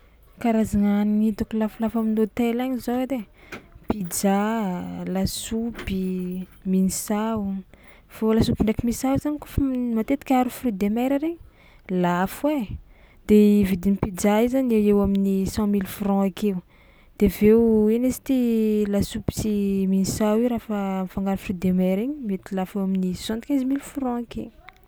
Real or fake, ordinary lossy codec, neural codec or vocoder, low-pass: real; none; none; none